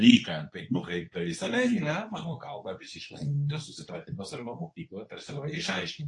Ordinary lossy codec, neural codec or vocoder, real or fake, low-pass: AAC, 48 kbps; codec, 24 kHz, 0.9 kbps, WavTokenizer, medium speech release version 2; fake; 10.8 kHz